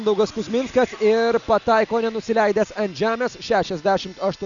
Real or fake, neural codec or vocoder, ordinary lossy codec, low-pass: real; none; MP3, 96 kbps; 7.2 kHz